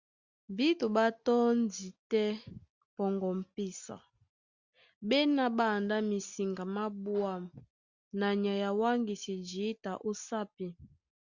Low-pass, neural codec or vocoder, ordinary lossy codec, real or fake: 7.2 kHz; none; Opus, 64 kbps; real